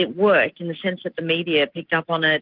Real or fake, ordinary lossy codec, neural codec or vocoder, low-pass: real; Opus, 32 kbps; none; 5.4 kHz